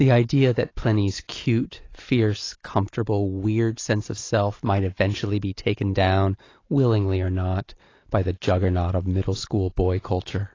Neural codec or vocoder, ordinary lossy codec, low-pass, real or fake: none; AAC, 32 kbps; 7.2 kHz; real